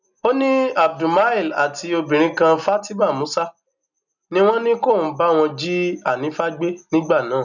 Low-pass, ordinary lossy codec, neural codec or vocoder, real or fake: 7.2 kHz; none; none; real